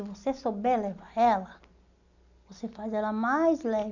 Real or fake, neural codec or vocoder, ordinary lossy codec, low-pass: real; none; none; 7.2 kHz